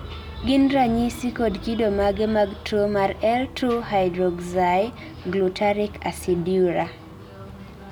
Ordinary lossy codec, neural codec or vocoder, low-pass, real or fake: none; none; none; real